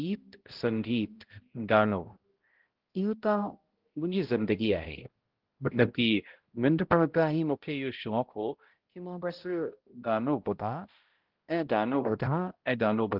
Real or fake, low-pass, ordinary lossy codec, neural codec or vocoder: fake; 5.4 kHz; Opus, 16 kbps; codec, 16 kHz, 0.5 kbps, X-Codec, HuBERT features, trained on balanced general audio